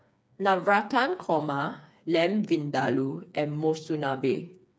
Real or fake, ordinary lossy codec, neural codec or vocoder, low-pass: fake; none; codec, 16 kHz, 4 kbps, FreqCodec, smaller model; none